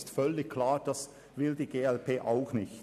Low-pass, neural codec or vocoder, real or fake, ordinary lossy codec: 14.4 kHz; none; real; none